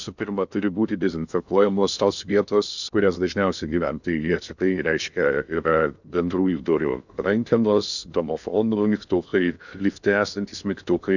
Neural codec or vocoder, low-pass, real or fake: codec, 16 kHz in and 24 kHz out, 0.6 kbps, FocalCodec, streaming, 2048 codes; 7.2 kHz; fake